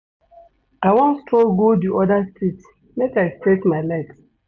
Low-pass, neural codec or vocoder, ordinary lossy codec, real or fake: 7.2 kHz; none; none; real